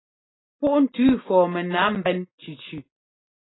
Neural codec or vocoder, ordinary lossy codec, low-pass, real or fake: none; AAC, 16 kbps; 7.2 kHz; real